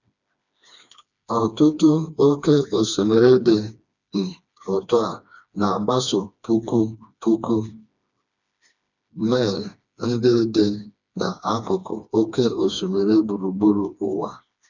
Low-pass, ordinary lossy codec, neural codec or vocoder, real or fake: 7.2 kHz; none; codec, 16 kHz, 2 kbps, FreqCodec, smaller model; fake